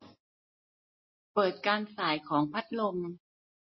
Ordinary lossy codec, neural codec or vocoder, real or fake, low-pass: MP3, 24 kbps; none; real; 7.2 kHz